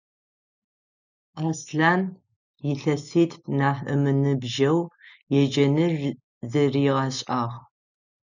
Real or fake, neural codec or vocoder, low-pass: real; none; 7.2 kHz